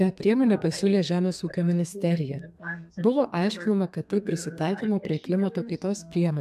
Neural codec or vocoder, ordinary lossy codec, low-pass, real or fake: codec, 32 kHz, 1.9 kbps, SNAC; AAC, 96 kbps; 14.4 kHz; fake